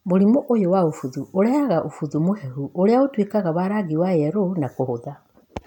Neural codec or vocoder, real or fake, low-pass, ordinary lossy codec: none; real; 19.8 kHz; none